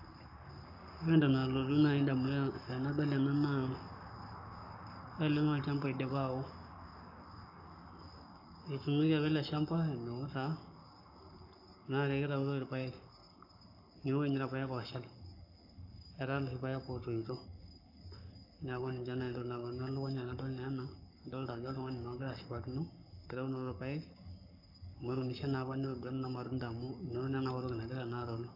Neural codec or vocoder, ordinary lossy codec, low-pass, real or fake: codec, 44.1 kHz, 7.8 kbps, Pupu-Codec; AAC, 48 kbps; 5.4 kHz; fake